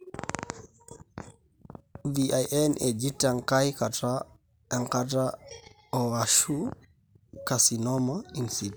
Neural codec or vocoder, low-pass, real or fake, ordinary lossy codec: none; none; real; none